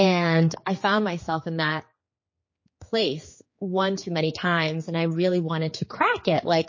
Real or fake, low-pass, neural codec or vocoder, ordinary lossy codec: fake; 7.2 kHz; codec, 16 kHz, 4 kbps, X-Codec, HuBERT features, trained on general audio; MP3, 32 kbps